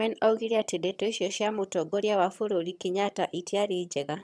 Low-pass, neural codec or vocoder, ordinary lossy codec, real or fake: none; vocoder, 22.05 kHz, 80 mel bands, HiFi-GAN; none; fake